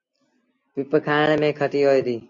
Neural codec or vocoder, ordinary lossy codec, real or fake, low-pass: none; AAC, 48 kbps; real; 7.2 kHz